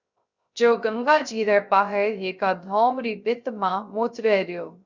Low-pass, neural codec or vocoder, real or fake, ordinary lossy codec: 7.2 kHz; codec, 16 kHz, 0.3 kbps, FocalCodec; fake; Opus, 64 kbps